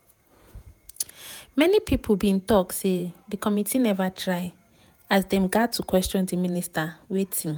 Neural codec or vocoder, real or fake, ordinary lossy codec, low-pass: vocoder, 48 kHz, 128 mel bands, Vocos; fake; none; none